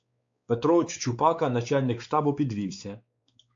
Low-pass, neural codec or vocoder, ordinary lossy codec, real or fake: 7.2 kHz; codec, 16 kHz, 4 kbps, X-Codec, WavLM features, trained on Multilingual LibriSpeech; AAC, 48 kbps; fake